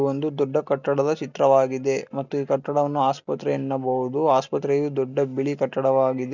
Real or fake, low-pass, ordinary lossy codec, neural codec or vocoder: real; 7.2 kHz; none; none